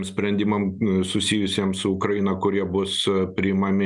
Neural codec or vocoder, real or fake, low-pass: none; real; 10.8 kHz